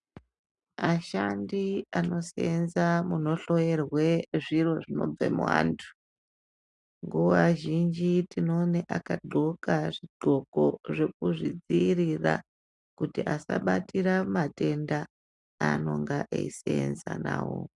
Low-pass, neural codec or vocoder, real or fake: 10.8 kHz; none; real